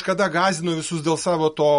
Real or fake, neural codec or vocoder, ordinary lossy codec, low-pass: real; none; MP3, 48 kbps; 10.8 kHz